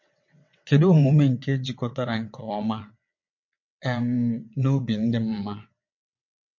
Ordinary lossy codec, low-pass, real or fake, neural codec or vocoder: MP3, 48 kbps; 7.2 kHz; fake; vocoder, 22.05 kHz, 80 mel bands, WaveNeXt